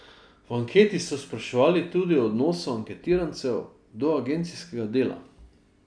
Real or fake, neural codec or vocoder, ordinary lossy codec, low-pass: real; none; none; 9.9 kHz